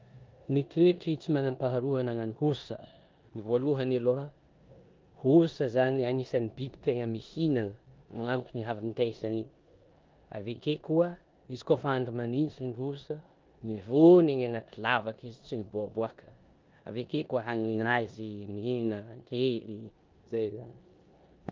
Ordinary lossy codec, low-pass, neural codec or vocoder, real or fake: Opus, 24 kbps; 7.2 kHz; codec, 16 kHz in and 24 kHz out, 0.9 kbps, LongCat-Audio-Codec, four codebook decoder; fake